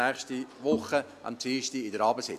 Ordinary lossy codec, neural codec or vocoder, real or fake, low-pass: MP3, 96 kbps; none; real; 14.4 kHz